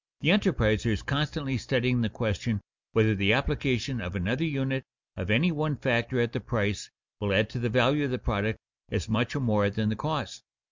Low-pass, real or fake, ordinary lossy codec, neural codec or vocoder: 7.2 kHz; real; MP3, 64 kbps; none